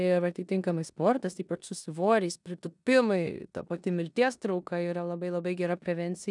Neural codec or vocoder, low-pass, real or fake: codec, 16 kHz in and 24 kHz out, 0.9 kbps, LongCat-Audio-Codec, four codebook decoder; 10.8 kHz; fake